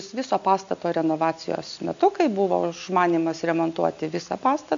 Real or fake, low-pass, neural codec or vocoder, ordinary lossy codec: real; 7.2 kHz; none; MP3, 64 kbps